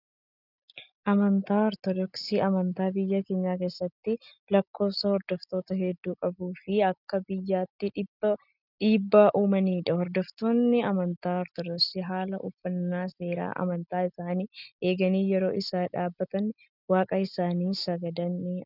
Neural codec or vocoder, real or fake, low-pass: none; real; 5.4 kHz